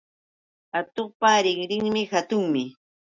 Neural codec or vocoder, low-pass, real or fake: none; 7.2 kHz; real